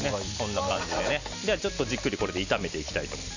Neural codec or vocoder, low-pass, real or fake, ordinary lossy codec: none; 7.2 kHz; real; none